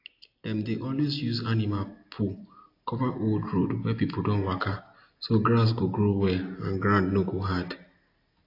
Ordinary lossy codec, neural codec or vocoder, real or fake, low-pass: AAC, 32 kbps; none; real; 5.4 kHz